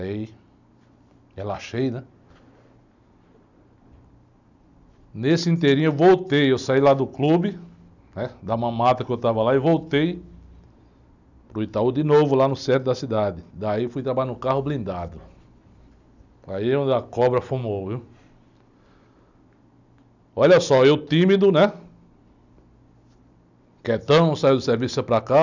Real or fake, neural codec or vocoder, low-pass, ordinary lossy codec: real; none; 7.2 kHz; none